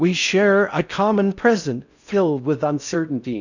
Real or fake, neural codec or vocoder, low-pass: fake; codec, 16 kHz in and 24 kHz out, 0.6 kbps, FocalCodec, streaming, 4096 codes; 7.2 kHz